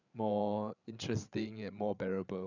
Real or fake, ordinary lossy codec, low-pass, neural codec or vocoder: fake; none; 7.2 kHz; codec, 16 kHz, 8 kbps, FreqCodec, larger model